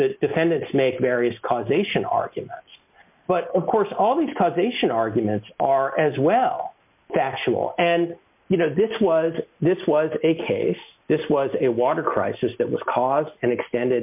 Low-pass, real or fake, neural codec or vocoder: 3.6 kHz; real; none